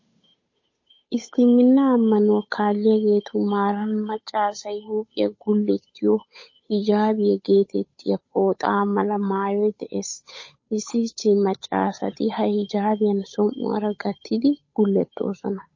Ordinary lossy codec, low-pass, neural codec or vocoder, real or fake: MP3, 32 kbps; 7.2 kHz; codec, 16 kHz, 8 kbps, FunCodec, trained on Chinese and English, 25 frames a second; fake